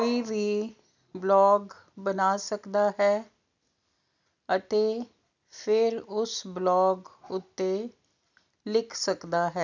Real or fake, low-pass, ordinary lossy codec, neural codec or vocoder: real; 7.2 kHz; none; none